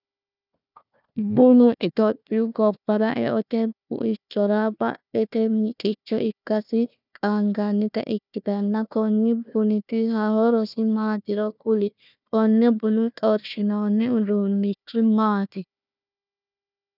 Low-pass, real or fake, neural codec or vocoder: 5.4 kHz; fake; codec, 16 kHz, 1 kbps, FunCodec, trained on Chinese and English, 50 frames a second